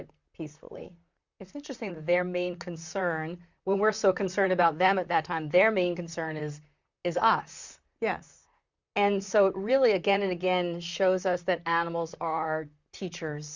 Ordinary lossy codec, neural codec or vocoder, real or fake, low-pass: Opus, 64 kbps; vocoder, 44.1 kHz, 128 mel bands, Pupu-Vocoder; fake; 7.2 kHz